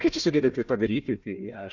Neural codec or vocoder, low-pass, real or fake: codec, 16 kHz, 1 kbps, FunCodec, trained on Chinese and English, 50 frames a second; 7.2 kHz; fake